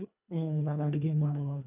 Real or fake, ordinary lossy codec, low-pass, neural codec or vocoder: fake; none; 3.6 kHz; codec, 24 kHz, 1.5 kbps, HILCodec